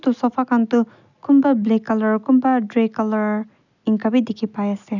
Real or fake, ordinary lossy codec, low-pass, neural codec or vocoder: real; none; 7.2 kHz; none